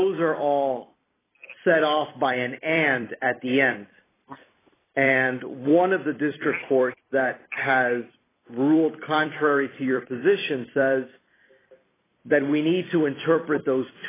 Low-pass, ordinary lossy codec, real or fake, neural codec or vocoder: 3.6 kHz; AAC, 16 kbps; fake; vocoder, 44.1 kHz, 128 mel bands every 256 samples, BigVGAN v2